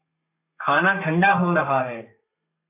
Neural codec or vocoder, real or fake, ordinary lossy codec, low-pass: codec, 32 kHz, 1.9 kbps, SNAC; fake; AAC, 32 kbps; 3.6 kHz